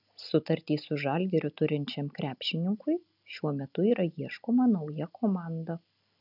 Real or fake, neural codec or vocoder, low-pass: real; none; 5.4 kHz